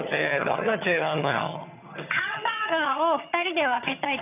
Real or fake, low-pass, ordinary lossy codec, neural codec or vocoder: fake; 3.6 kHz; none; vocoder, 22.05 kHz, 80 mel bands, HiFi-GAN